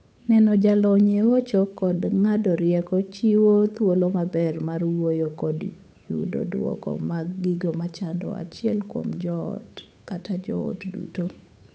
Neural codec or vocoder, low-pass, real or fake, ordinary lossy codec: codec, 16 kHz, 8 kbps, FunCodec, trained on Chinese and English, 25 frames a second; none; fake; none